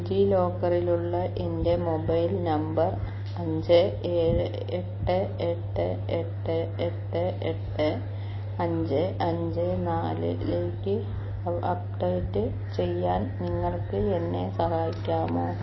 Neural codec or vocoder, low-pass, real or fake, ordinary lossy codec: none; 7.2 kHz; real; MP3, 24 kbps